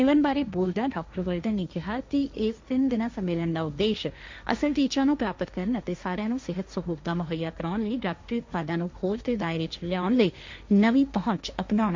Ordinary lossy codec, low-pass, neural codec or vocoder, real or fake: MP3, 64 kbps; 7.2 kHz; codec, 16 kHz, 1.1 kbps, Voila-Tokenizer; fake